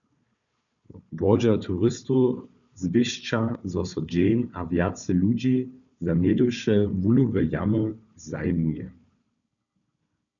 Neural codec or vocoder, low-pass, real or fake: codec, 16 kHz, 4 kbps, FunCodec, trained on Chinese and English, 50 frames a second; 7.2 kHz; fake